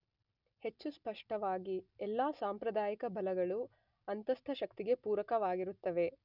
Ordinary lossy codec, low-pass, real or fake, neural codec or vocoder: none; 5.4 kHz; real; none